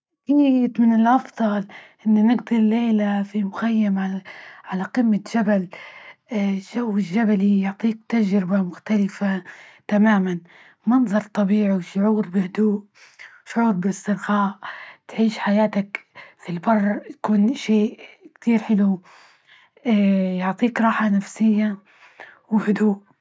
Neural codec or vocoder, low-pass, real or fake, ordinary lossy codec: none; none; real; none